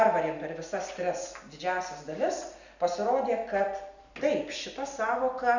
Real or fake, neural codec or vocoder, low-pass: real; none; 7.2 kHz